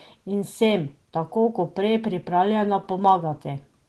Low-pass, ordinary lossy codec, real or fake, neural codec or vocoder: 10.8 kHz; Opus, 16 kbps; real; none